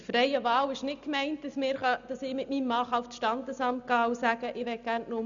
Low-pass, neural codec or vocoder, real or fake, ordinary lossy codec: 7.2 kHz; none; real; none